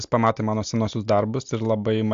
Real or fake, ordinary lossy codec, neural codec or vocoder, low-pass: real; AAC, 64 kbps; none; 7.2 kHz